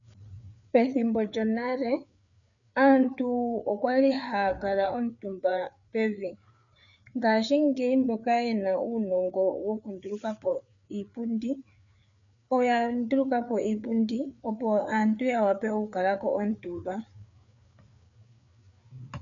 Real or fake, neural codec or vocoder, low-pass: fake; codec, 16 kHz, 4 kbps, FreqCodec, larger model; 7.2 kHz